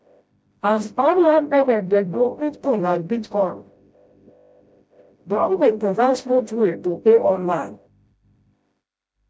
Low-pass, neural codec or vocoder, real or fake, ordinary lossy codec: none; codec, 16 kHz, 0.5 kbps, FreqCodec, smaller model; fake; none